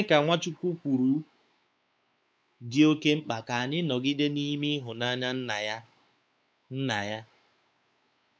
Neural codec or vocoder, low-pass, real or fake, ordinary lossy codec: codec, 16 kHz, 4 kbps, X-Codec, WavLM features, trained on Multilingual LibriSpeech; none; fake; none